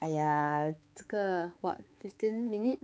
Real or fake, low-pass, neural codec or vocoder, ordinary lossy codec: fake; none; codec, 16 kHz, 4 kbps, X-Codec, HuBERT features, trained on balanced general audio; none